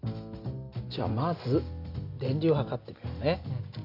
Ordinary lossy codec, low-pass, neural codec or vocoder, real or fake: none; 5.4 kHz; none; real